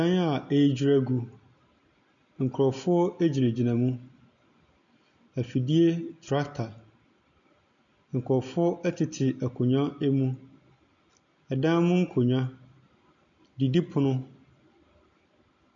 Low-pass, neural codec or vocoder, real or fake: 7.2 kHz; none; real